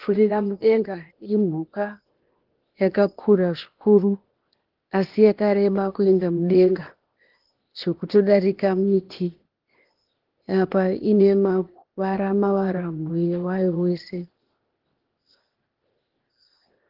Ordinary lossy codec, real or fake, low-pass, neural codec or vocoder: Opus, 16 kbps; fake; 5.4 kHz; codec, 16 kHz, 0.8 kbps, ZipCodec